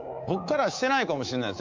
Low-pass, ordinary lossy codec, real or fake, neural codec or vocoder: 7.2 kHz; MP3, 64 kbps; fake; codec, 24 kHz, 3.1 kbps, DualCodec